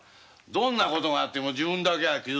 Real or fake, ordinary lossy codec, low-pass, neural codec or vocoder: real; none; none; none